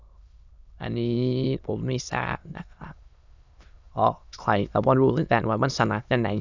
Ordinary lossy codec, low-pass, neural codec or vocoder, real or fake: none; 7.2 kHz; autoencoder, 22.05 kHz, a latent of 192 numbers a frame, VITS, trained on many speakers; fake